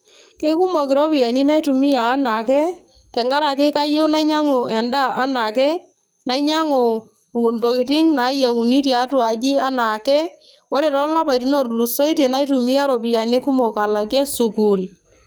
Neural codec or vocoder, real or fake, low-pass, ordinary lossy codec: codec, 44.1 kHz, 2.6 kbps, SNAC; fake; none; none